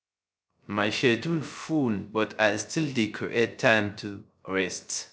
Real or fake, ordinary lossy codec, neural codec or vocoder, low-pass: fake; none; codec, 16 kHz, 0.3 kbps, FocalCodec; none